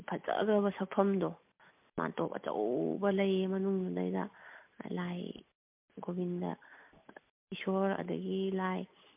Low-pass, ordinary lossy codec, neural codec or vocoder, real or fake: 3.6 kHz; MP3, 32 kbps; none; real